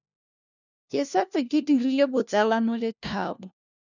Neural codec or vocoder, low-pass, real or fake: codec, 16 kHz, 1 kbps, FunCodec, trained on LibriTTS, 50 frames a second; 7.2 kHz; fake